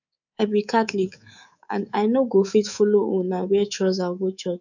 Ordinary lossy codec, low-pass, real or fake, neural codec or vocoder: none; 7.2 kHz; fake; codec, 24 kHz, 3.1 kbps, DualCodec